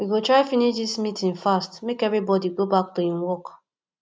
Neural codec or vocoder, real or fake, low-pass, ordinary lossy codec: none; real; none; none